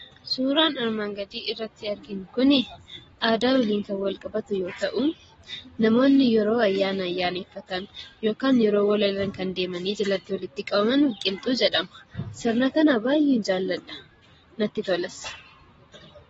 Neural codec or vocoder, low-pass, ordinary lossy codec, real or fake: none; 19.8 kHz; AAC, 24 kbps; real